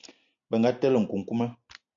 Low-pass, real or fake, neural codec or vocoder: 7.2 kHz; real; none